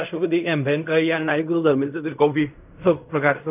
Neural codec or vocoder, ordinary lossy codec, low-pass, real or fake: codec, 16 kHz in and 24 kHz out, 0.4 kbps, LongCat-Audio-Codec, fine tuned four codebook decoder; none; 3.6 kHz; fake